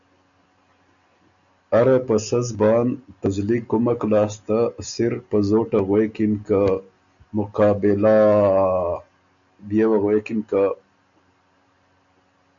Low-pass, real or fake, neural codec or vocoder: 7.2 kHz; real; none